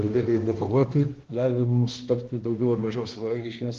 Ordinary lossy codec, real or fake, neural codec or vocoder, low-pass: Opus, 16 kbps; fake; codec, 16 kHz, 1 kbps, X-Codec, HuBERT features, trained on balanced general audio; 7.2 kHz